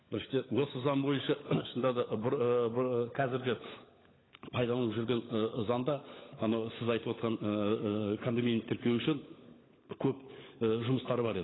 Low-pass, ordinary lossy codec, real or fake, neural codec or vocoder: 7.2 kHz; AAC, 16 kbps; fake; codec, 16 kHz, 16 kbps, FunCodec, trained on LibriTTS, 50 frames a second